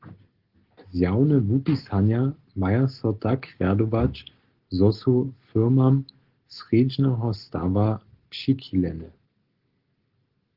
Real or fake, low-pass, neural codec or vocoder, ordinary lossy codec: real; 5.4 kHz; none; Opus, 16 kbps